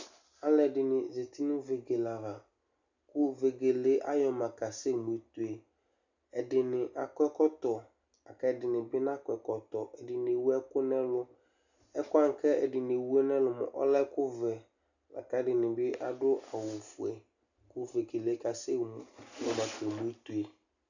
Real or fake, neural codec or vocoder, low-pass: real; none; 7.2 kHz